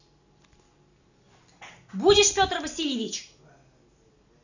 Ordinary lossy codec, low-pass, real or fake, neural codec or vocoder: none; 7.2 kHz; real; none